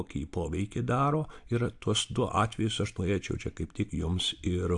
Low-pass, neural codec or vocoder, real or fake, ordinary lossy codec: 10.8 kHz; none; real; AAC, 64 kbps